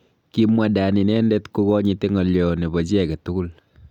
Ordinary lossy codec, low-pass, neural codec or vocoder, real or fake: none; 19.8 kHz; none; real